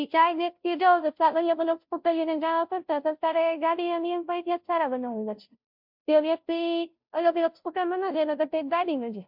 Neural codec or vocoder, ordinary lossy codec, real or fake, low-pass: codec, 16 kHz, 0.5 kbps, FunCodec, trained on Chinese and English, 25 frames a second; MP3, 48 kbps; fake; 5.4 kHz